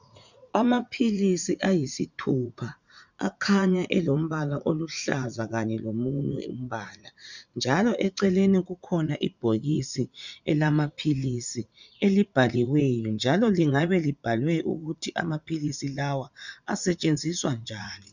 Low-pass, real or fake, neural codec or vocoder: 7.2 kHz; fake; vocoder, 44.1 kHz, 80 mel bands, Vocos